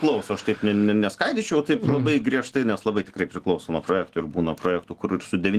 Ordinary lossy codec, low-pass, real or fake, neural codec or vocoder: Opus, 16 kbps; 14.4 kHz; fake; autoencoder, 48 kHz, 128 numbers a frame, DAC-VAE, trained on Japanese speech